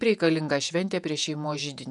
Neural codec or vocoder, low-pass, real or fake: vocoder, 48 kHz, 128 mel bands, Vocos; 10.8 kHz; fake